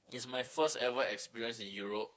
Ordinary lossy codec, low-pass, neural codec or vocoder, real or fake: none; none; codec, 16 kHz, 4 kbps, FreqCodec, smaller model; fake